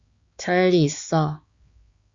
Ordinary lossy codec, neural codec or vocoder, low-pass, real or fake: Opus, 64 kbps; codec, 16 kHz, 4 kbps, X-Codec, HuBERT features, trained on balanced general audio; 7.2 kHz; fake